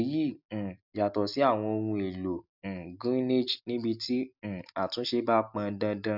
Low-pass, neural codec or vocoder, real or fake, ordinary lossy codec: 5.4 kHz; none; real; none